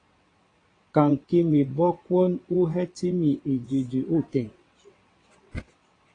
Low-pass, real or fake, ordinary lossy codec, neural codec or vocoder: 9.9 kHz; fake; AAC, 32 kbps; vocoder, 22.05 kHz, 80 mel bands, WaveNeXt